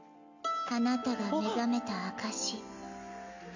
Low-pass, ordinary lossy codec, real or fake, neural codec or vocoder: 7.2 kHz; none; real; none